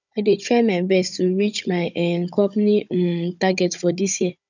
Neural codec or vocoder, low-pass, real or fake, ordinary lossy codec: codec, 16 kHz, 16 kbps, FunCodec, trained on Chinese and English, 50 frames a second; 7.2 kHz; fake; none